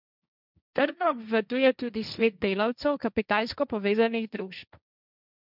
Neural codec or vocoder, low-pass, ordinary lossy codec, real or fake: codec, 16 kHz, 1.1 kbps, Voila-Tokenizer; 5.4 kHz; none; fake